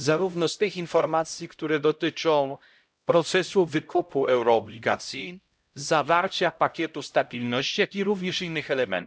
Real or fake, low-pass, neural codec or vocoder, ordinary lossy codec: fake; none; codec, 16 kHz, 0.5 kbps, X-Codec, HuBERT features, trained on LibriSpeech; none